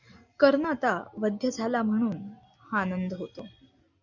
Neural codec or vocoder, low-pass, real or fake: none; 7.2 kHz; real